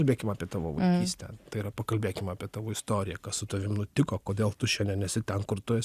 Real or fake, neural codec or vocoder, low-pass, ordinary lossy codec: real; none; 14.4 kHz; Opus, 64 kbps